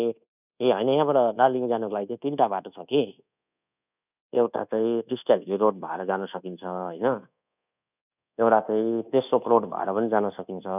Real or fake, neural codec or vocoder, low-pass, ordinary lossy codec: fake; codec, 24 kHz, 1.2 kbps, DualCodec; 3.6 kHz; none